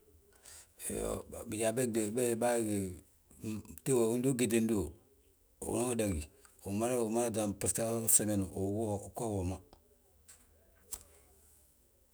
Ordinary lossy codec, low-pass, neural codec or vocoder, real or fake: none; none; autoencoder, 48 kHz, 128 numbers a frame, DAC-VAE, trained on Japanese speech; fake